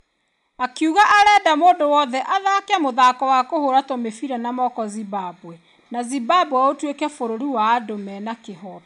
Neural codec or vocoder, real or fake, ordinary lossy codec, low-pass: none; real; none; 10.8 kHz